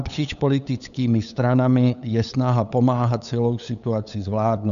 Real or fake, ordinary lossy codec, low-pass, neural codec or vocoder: fake; MP3, 96 kbps; 7.2 kHz; codec, 16 kHz, 8 kbps, FunCodec, trained on LibriTTS, 25 frames a second